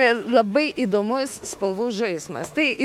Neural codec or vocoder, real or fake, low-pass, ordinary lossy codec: autoencoder, 48 kHz, 32 numbers a frame, DAC-VAE, trained on Japanese speech; fake; 19.8 kHz; MP3, 96 kbps